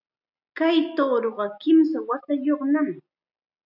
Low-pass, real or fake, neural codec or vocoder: 5.4 kHz; real; none